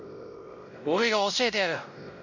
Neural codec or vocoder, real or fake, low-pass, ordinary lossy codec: codec, 16 kHz, 0.5 kbps, X-Codec, WavLM features, trained on Multilingual LibriSpeech; fake; 7.2 kHz; none